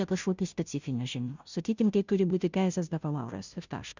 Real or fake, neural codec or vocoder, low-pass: fake; codec, 16 kHz, 0.5 kbps, FunCodec, trained on Chinese and English, 25 frames a second; 7.2 kHz